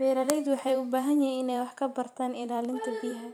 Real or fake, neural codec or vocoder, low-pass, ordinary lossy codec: fake; vocoder, 44.1 kHz, 128 mel bands every 512 samples, BigVGAN v2; 19.8 kHz; none